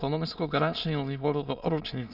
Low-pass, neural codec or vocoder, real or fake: 5.4 kHz; autoencoder, 22.05 kHz, a latent of 192 numbers a frame, VITS, trained on many speakers; fake